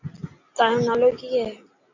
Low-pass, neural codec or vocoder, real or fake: 7.2 kHz; none; real